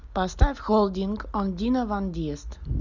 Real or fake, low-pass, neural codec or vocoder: real; 7.2 kHz; none